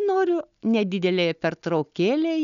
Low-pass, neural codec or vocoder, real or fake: 7.2 kHz; none; real